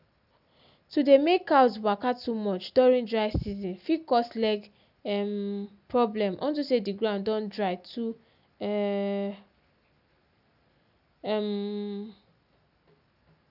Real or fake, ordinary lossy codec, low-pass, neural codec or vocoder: real; none; 5.4 kHz; none